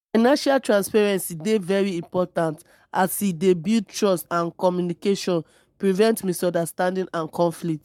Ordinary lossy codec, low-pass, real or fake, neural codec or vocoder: MP3, 96 kbps; 19.8 kHz; fake; codec, 44.1 kHz, 7.8 kbps, Pupu-Codec